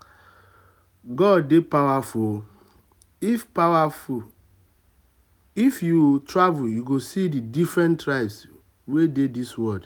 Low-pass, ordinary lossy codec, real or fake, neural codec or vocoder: none; none; real; none